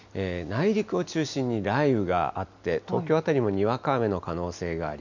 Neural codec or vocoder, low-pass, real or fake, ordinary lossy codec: none; 7.2 kHz; real; none